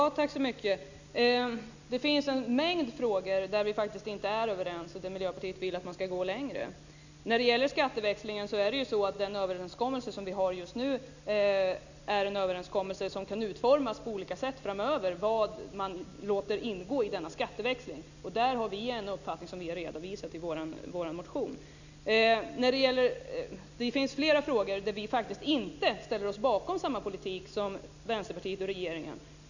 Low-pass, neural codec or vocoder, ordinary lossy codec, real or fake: 7.2 kHz; none; none; real